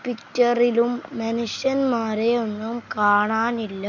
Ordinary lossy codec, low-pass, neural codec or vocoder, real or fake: none; 7.2 kHz; none; real